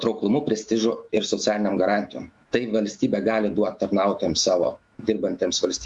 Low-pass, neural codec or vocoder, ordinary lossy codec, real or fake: 10.8 kHz; autoencoder, 48 kHz, 128 numbers a frame, DAC-VAE, trained on Japanese speech; Opus, 64 kbps; fake